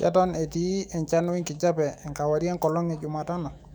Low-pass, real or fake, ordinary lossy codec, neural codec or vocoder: 19.8 kHz; fake; none; autoencoder, 48 kHz, 128 numbers a frame, DAC-VAE, trained on Japanese speech